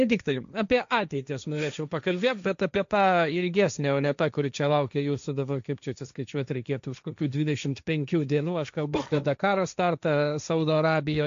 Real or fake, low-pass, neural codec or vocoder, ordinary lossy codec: fake; 7.2 kHz; codec, 16 kHz, 1.1 kbps, Voila-Tokenizer; MP3, 64 kbps